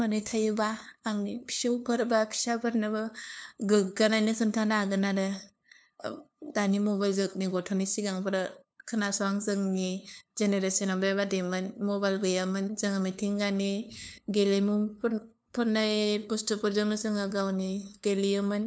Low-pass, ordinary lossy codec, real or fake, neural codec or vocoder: none; none; fake; codec, 16 kHz, 2 kbps, FunCodec, trained on LibriTTS, 25 frames a second